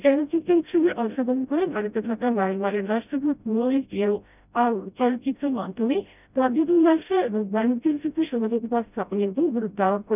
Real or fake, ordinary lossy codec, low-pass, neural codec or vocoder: fake; none; 3.6 kHz; codec, 16 kHz, 0.5 kbps, FreqCodec, smaller model